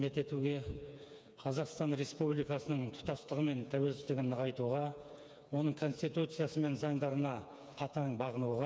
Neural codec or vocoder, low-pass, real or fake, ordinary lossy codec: codec, 16 kHz, 4 kbps, FreqCodec, smaller model; none; fake; none